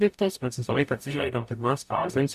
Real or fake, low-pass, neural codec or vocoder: fake; 14.4 kHz; codec, 44.1 kHz, 0.9 kbps, DAC